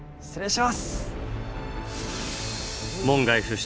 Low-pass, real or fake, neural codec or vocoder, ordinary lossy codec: none; real; none; none